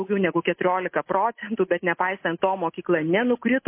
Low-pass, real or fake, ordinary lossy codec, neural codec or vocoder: 3.6 kHz; real; MP3, 24 kbps; none